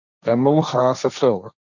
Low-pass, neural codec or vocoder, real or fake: 7.2 kHz; codec, 16 kHz, 1.1 kbps, Voila-Tokenizer; fake